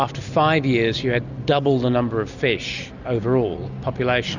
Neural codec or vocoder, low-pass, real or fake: none; 7.2 kHz; real